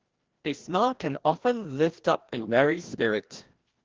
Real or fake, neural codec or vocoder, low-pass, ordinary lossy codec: fake; codec, 16 kHz, 1 kbps, FreqCodec, larger model; 7.2 kHz; Opus, 16 kbps